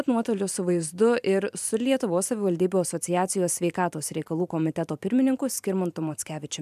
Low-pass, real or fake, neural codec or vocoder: 14.4 kHz; fake; vocoder, 44.1 kHz, 128 mel bands every 512 samples, BigVGAN v2